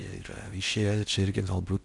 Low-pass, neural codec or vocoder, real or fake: 10.8 kHz; codec, 16 kHz in and 24 kHz out, 0.6 kbps, FocalCodec, streaming, 4096 codes; fake